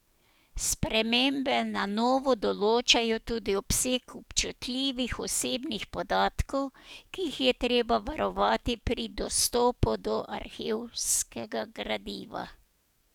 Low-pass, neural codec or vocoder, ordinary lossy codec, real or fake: 19.8 kHz; codec, 44.1 kHz, 7.8 kbps, DAC; none; fake